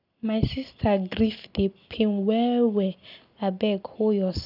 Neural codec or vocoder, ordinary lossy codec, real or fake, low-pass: none; none; real; 5.4 kHz